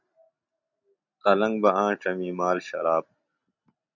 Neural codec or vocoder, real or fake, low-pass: none; real; 7.2 kHz